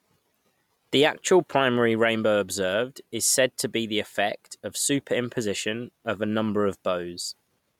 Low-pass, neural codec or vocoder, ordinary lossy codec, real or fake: 19.8 kHz; none; MP3, 96 kbps; real